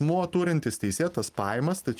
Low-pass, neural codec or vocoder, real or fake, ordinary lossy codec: 14.4 kHz; none; real; Opus, 32 kbps